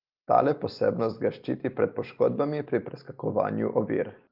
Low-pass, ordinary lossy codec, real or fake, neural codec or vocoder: 5.4 kHz; Opus, 32 kbps; real; none